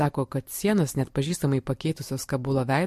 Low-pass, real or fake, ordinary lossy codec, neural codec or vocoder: 14.4 kHz; fake; MP3, 64 kbps; vocoder, 44.1 kHz, 128 mel bands every 256 samples, BigVGAN v2